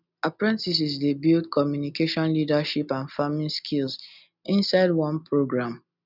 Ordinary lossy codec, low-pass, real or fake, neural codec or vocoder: none; 5.4 kHz; real; none